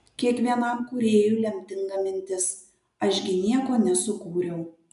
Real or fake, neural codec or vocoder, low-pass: real; none; 10.8 kHz